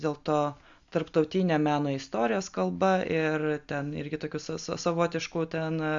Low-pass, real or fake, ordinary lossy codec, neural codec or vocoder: 7.2 kHz; real; Opus, 64 kbps; none